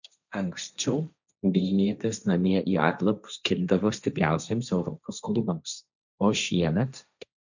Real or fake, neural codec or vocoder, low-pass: fake; codec, 16 kHz, 1.1 kbps, Voila-Tokenizer; 7.2 kHz